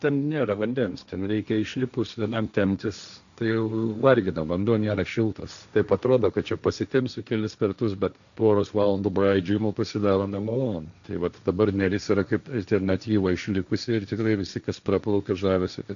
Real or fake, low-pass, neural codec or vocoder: fake; 7.2 kHz; codec, 16 kHz, 1.1 kbps, Voila-Tokenizer